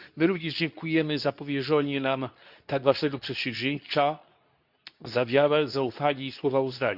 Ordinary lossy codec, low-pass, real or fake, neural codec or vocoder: none; 5.4 kHz; fake; codec, 24 kHz, 0.9 kbps, WavTokenizer, medium speech release version 2